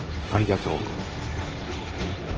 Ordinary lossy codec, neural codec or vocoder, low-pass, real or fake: Opus, 16 kbps; codec, 16 kHz, 1.1 kbps, Voila-Tokenizer; 7.2 kHz; fake